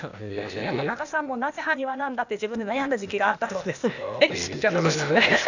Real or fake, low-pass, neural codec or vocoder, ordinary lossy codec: fake; 7.2 kHz; codec, 16 kHz, 0.8 kbps, ZipCodec; none